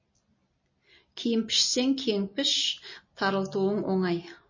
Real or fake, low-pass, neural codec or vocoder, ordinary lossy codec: real; 7.2 kHz; none; MP3, 32 kbps